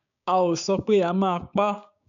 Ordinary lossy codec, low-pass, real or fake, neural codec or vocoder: none; 7.2 kHz; fake; codec, 16 kHz, 6 kbps, DAC